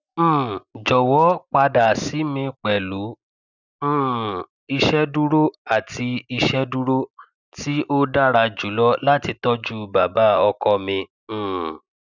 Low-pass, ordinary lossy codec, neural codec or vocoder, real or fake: 7.2 kHz; none; none; real